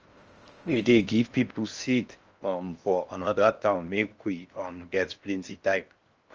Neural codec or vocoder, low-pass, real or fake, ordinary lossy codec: codec, 16 kHz in and 24 kHz out, 0.6 kbps, FocalCodec, streaming, 4096 codes; 7.2 kHz; fake; Opus, 24 kbps